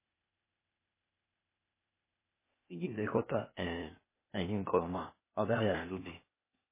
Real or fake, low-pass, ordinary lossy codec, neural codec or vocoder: fake; 3.6 kHz; MP3, 16 kbps; codec, 16 kHz, 0.8 kbps, ZipCodec